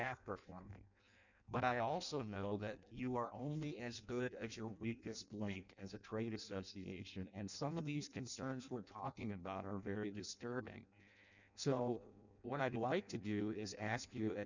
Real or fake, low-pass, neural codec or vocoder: fake; 7.2 kHz; codec, 16 kHz in and 24 kHz out, 0.6 kbps, FireRedTTS-2 codec